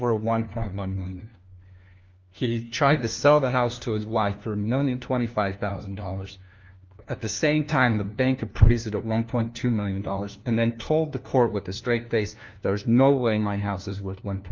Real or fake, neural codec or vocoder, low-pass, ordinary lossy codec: fake; codec, 16 kHz, 1 kbps, FunCodec, trained on LibriTTS, 50 frames a second; 7.2 kHz; Opus, 24 kbps